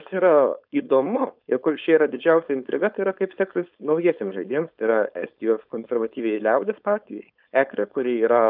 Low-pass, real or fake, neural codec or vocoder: 5.4 kHz; fake; codec, 16 kHz, 4.8 kbps, FACodec